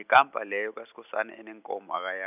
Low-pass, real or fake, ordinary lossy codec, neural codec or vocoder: 3.6 kHz; real; none; none